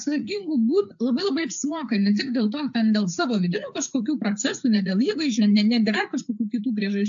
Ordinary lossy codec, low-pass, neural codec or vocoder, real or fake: AAC, 64 kbps; 7.2 kHz; codec, 16 kHz, 4 kbps, FreqCodec, larger model; fake